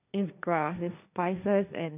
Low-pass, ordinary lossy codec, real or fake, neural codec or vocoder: 3.6 kHz; none; fake; codec, 16 kHz, 1.1 kbps, Voila-Tokenizer